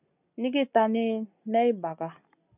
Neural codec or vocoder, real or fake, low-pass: none; real; 3.6 kHz